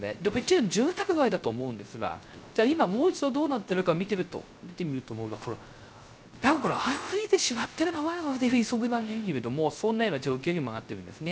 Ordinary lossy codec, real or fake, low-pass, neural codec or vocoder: none; fake; none; codec, 16 kHz, 0.3 kbps, FocalCodec